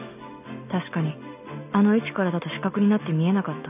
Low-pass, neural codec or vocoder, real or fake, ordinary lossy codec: 3.6 kHz; none; real; none